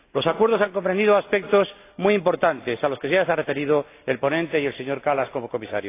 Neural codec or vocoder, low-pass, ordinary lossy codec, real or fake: none; 3.6 kHz; AAC, 24 kbps; real